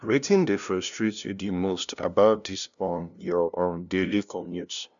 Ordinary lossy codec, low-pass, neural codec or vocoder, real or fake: none; 7.2 kHz; codec, 16 kHz, 0.5 kbps, FunCodec, trained on LibriTTS, 25 frames a second; fake